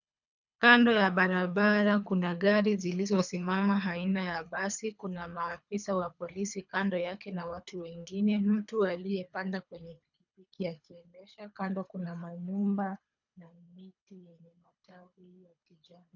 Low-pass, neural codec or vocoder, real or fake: 7.2 kHz; codec, 24 kHz, 3 kbps, HILCodec; fake